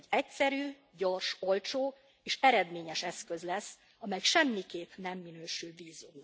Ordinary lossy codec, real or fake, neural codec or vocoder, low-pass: none; real; none; none